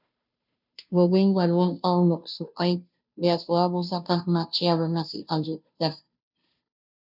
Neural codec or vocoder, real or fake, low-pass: codec, 16 kHz, 0.5 kbps, FunCodec, trained on Chinese and English, 25 frames a second; fake; 5.4 kHz